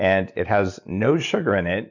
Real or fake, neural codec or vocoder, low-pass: real; none; 7.2 kHz